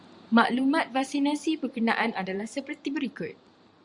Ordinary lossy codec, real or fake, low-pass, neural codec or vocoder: Opus, 64 kbps; fake; 10.8 kHz; vocoder, 44.1 kHz, 128 mel bands every 512 samples, BigVGAN v2